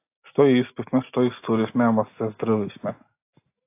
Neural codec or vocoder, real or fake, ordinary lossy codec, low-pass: none; real; AAC, 24 kbps; 3.6 kHz